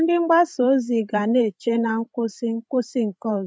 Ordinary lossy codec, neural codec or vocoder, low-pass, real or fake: none; codec, 16 kHz, 16 kbps, FreqCodec, larger model; none; fake